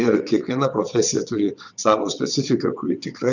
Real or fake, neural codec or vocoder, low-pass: fake; vocoder, 22.05 kHz, 80 mel bands, WaveNeXt; 7.2 kHz